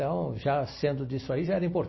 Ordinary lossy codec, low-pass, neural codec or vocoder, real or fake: MP3, 24 kbps; 7.2 kHz; none; real